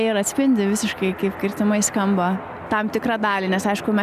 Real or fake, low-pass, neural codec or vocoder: real; 14.4 kHz; none